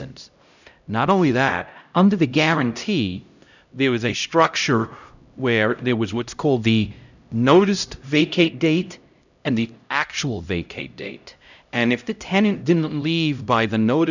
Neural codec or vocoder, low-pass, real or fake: codec, 16 kHz, 0.5 kbps, X-Codec, HuBERT features, trained on LibriSpeech; 7.2 kHz; fake